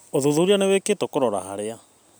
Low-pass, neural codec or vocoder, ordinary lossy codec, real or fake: none; none; none; real